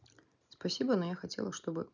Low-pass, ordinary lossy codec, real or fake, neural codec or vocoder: 7.2 kHz; none; real; none